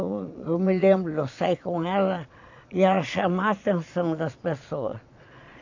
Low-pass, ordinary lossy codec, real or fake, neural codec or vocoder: 7.2 kHz; AAC, 48 kbps; fake; vocoder, 22.05 kHz, 80 mel bands, Vocos